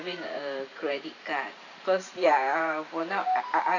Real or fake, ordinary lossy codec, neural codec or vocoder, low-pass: fake; none; vocoder, 44.1 kHz, 128 mel bands every 512 samples, BigVGAN v2; 7.2 kHz